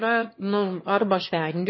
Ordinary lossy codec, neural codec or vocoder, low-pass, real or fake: MP3, 24 kbps; autoencoder, 22.05 kHz, a latent of 192 numbers a frame, VITS, trained on one speaker; 7.2 kHz; fake